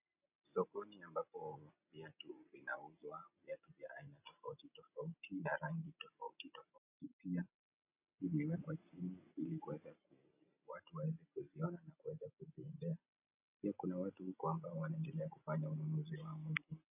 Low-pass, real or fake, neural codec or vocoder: 3.6 kHz; real; none